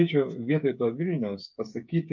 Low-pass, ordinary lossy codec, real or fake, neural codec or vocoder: 7.2 kHz; MP3, 48 kbps; fake; codec, 16 kHz, 8 kbps, FreqCodec, smaller model